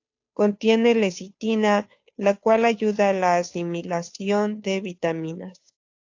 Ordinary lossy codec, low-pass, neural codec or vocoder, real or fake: AAC, 48 kbps; 7.2 kHz; codec, 16 kHz, 2 kbps, FunCodec, trained on Chinese and English, 25 frames a second; fake